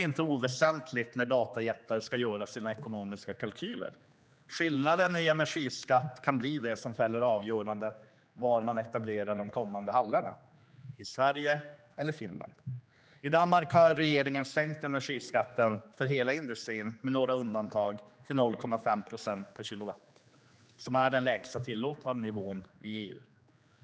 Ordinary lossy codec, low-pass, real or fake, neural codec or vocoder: none; none; fake; codec, 16 kHz, 2 kbps, X-Codec, HuBERT features, trained on general audio